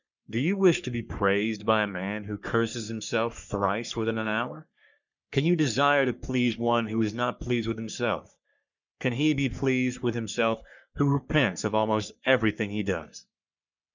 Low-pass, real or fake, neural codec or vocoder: 7.2 kHz; fake; codec, 44.1 kHz, 3.4 kbps, Pupu-Codec